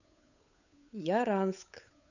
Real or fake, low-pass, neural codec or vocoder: fake; 7.2 kHz; codec, 16 kHz, 8 kbps, FunCodec, trained on Chinese and English, 25 frames a second